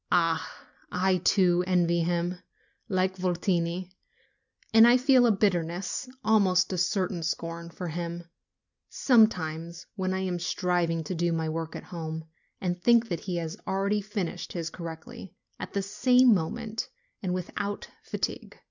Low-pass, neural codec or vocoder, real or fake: 7.2 kHz; none; real